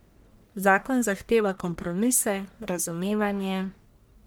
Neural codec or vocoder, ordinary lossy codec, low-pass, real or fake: codec, 44.1 kHz, 1.7 kbps, Pupu-Codec; none; none; fake